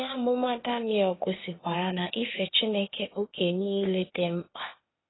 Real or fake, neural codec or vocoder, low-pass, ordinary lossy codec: fake; codec, 16 kHz, 0.8 kbps, ZipCodec; 7.2 kHz; AAC, 16 kbps